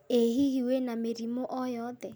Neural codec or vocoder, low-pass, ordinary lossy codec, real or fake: none; none; none; real